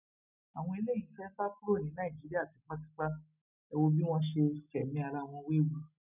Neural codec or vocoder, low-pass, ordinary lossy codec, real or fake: none; 3.6 kHz; none; real